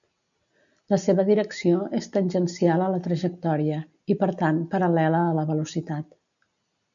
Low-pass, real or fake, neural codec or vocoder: 7.2 kHz; real; none